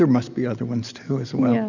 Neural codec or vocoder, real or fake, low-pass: none; real; 7.2 kHz